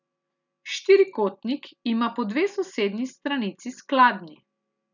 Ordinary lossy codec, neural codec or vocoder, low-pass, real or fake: none; none; 7.2 kHz; real